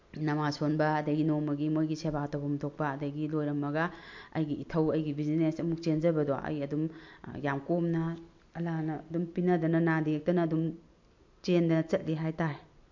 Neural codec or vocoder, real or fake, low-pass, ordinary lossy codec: none; real; 7.2 kHz; MP3, 48 kbps